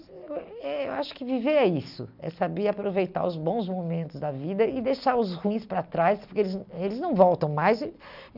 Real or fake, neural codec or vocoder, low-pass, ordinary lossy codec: real; none; 5.4 kHz; none